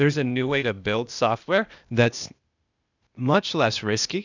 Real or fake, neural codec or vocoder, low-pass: fake; codec, 16 kHz, 0.8 kbps, ZipCodec; 7.2 kHz